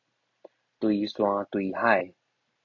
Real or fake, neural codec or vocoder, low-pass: real; none; 7.2 kHz